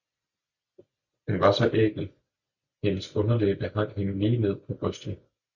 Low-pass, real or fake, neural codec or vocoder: 7.2 kHz; real; none